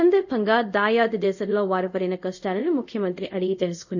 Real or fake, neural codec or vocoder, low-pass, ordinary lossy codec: fake; codec, 24 kHz, 0.5 kbps, DualCodec; 7.2 kHz; none